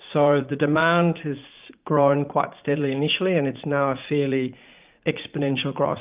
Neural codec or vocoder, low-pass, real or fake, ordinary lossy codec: vocoder, 44.1 kHz, 80 mel bands, Vocos; 3.6 kHz; fake; Opus, 24 kbps